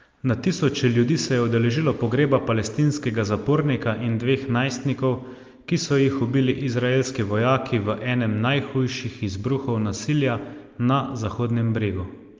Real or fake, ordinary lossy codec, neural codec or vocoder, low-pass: real; Opus, 32 kbps; none; 7.2 kHz